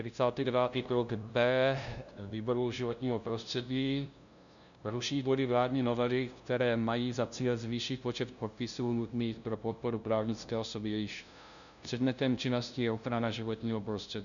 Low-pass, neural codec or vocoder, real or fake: 7.2 kHz; codec, 16 kHz, 0.5 kbps, FunCodec, trained on LibriTTS, 25 frames a second; fake